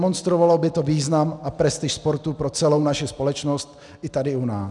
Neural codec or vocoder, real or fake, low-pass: none; real; 10.8 kHz